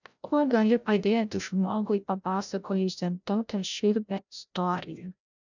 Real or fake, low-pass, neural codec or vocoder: fake; 7.2 kHz; codec, 16 kHz, 0.5 kbps, FreqCodec, larger model